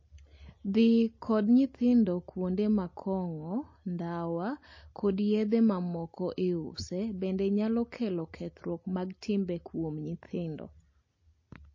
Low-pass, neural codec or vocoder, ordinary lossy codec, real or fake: 7.2 kHz; none; MP3, 32 kbps; real